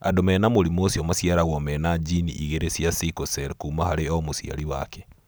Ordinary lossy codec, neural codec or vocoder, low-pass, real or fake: none; none; none; real